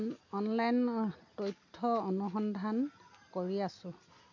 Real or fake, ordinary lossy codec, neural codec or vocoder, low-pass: real; none; none; 7.2 kHz